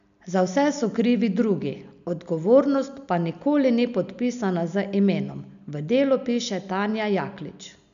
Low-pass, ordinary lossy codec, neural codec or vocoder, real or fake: 7.2 kHz; none; none; real